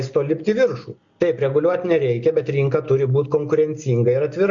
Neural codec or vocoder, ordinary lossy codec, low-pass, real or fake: none; MP3, 48 kbps; 7.2 kHz; real